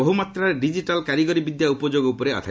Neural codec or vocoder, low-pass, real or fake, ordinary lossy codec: none; none; real; none